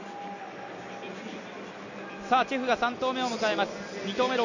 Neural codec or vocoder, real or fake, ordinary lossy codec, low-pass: none; real; none; 7.2 kHz